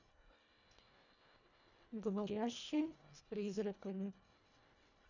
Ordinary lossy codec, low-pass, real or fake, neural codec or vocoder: none; 7.2 kHz; fake; codec, 24 kHz, 1.5 kbps, HILCodec